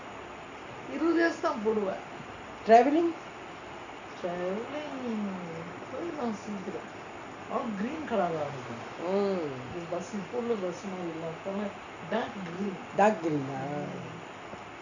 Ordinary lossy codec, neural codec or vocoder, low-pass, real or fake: none; none; 7.2 kHz; real